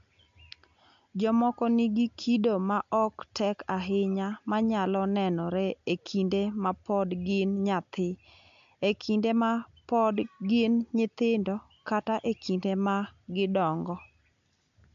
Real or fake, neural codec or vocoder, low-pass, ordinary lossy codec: real; none; 7.2 kHz; MP3, 64 kbps